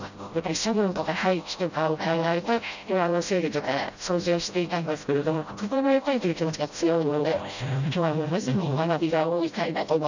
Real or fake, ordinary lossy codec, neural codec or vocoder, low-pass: fake; none; codec, 16 kHz, 0.5 kbps, FreqCodec, smaller model; 7.2 kHz